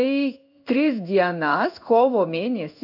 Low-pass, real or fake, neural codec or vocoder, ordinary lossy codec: 5.4 kHz; fake; codec, 16 kHz in and 24 kHz out, 1 kbps, XY-Tokenizer; AAC, 48 kbps